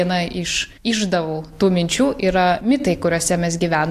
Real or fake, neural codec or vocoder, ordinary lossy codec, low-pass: real; none; AAC, 64 kbps; 14.4 kHz